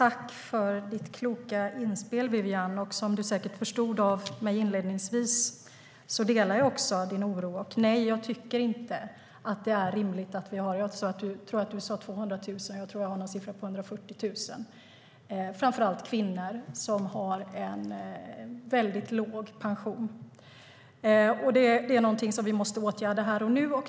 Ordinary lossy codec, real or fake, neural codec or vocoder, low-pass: none; real; none; none